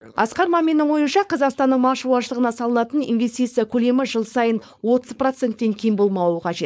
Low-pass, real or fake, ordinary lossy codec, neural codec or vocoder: none; fake; none; codec, 16 kHz, 4.8 kbps, FACodec